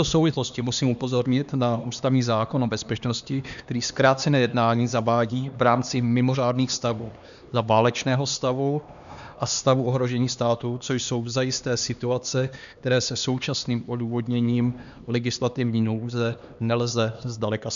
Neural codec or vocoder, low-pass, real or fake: codec, 16 kHz, 2 kbps, X-Codec, HuBERT features, trained on LibriSpeech; 7.2 kHz; fake